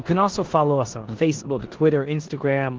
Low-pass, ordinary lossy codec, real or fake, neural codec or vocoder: 7.2 kHz; Opus, 16 kbps; fake; codec, 16 kHz in and 24 kHz out, 0.9 kbps, LongCat-Audio-Codec, four codebook decoder